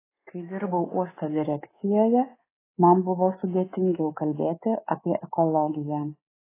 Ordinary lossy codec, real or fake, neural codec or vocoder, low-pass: AAC, 16 kbps; fake; codec, 24 kHz, 3.1 kbps, DualCodec; 3.6 kHz